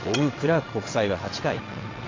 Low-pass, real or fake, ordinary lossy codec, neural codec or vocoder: 7.2 kHz; fake; AAC, 32 kbps; vocoder, 22.05 kHz, 80 mel bands, WaveNeXt